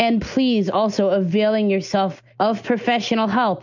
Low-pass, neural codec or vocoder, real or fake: 7.2 kHz; none; real